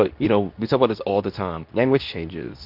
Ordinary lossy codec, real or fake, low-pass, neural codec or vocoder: MP3, 48 kbps; fake; 5.4 kHz; codec, 24 kHz, 0.9 kbps, WavTokenizer, medium speech release version 2